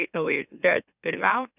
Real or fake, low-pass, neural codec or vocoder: fake; 3.6 kHz; autoencoder, 44.1 kHz, a latent of 192 numbers a frame, MeloTTS